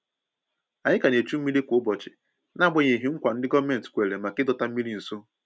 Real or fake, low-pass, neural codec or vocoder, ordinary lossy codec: real; none; none; none